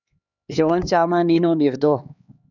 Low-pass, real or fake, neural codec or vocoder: 7.2 kHz; fake; codec, 16 kHz, 2 kbps, X-Codec, HuBERT features, trained on LibriSpeech